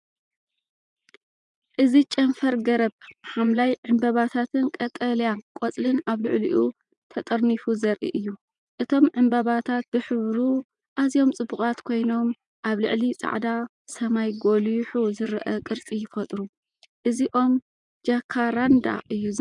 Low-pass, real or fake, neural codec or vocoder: 10.8 kHz; fake; vocoder, 24 kHz, 100 mel bands, Vocos